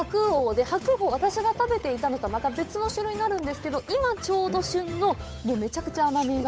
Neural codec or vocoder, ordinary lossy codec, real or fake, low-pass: codec, 16 kHz, 8 kbps, FunCodec, trained on Chinese and English, 25 frames a second; none; fake; none